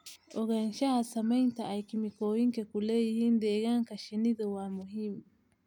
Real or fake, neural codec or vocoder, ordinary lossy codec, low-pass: real; none; none; 19.8 kHz